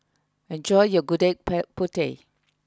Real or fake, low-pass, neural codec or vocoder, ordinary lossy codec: real; none; none; none